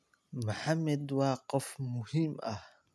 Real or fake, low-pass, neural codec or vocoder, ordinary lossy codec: real; none; none; none